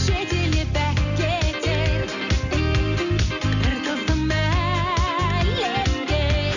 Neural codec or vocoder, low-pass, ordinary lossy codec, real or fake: none; 7.2 kHz; none; real